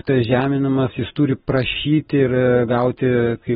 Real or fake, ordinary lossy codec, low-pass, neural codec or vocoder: real; AAC, 16 kbps; 14.4 kHz; none